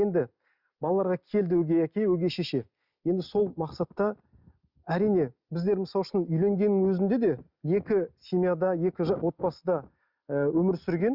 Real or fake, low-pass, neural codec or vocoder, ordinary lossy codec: real; 5.4 kHz; none; Opus, 64 kbps